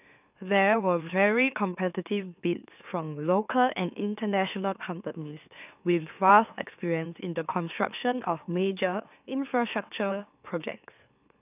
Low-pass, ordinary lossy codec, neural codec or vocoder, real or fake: 3.6 kHz; none; autoencoder, 44.1 kHz, a latent of 192 numbers a frame, MeloTTS; fake